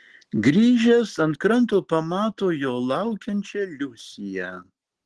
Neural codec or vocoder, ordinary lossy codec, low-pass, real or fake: none; Opus, 16 kbps; 10.8 kHz; real